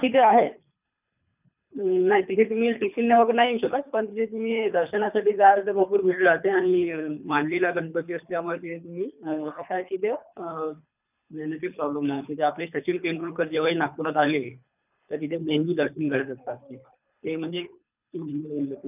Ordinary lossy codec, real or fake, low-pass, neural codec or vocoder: none; fake; 3.6 kHz; codec, 24 kHz, 3 kbps, HILCodec